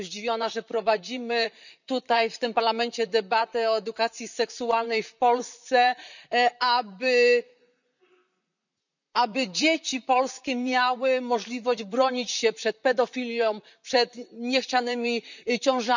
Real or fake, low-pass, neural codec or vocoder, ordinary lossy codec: fake; 7.2 kHz; vocoder, 44.1 kHz, 128 mel bands, Pupu-Vocoder; none